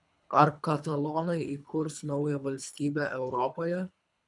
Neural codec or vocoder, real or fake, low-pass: codec, 24 kHz, 3 kbps, HILCodec; fake; 10.8 kHz